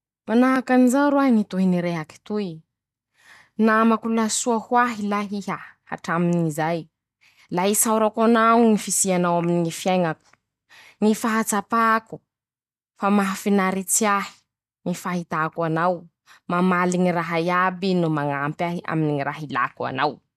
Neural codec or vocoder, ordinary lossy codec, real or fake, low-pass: none; none; real; 14.4 kHz